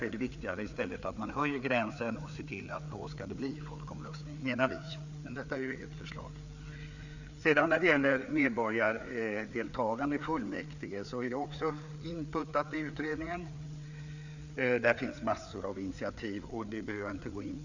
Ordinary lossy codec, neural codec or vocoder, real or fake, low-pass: none; codec, 16 kHz, 4 kbps, FreqCodec, larger model; fake; 7.2 kHz